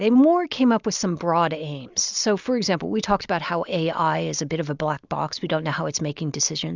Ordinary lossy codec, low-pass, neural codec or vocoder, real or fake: Opus, 64 kbps; 7.2 kHz; none; real